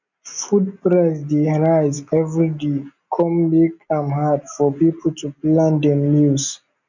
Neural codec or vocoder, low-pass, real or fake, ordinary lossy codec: none; 7.2 kHz; real; none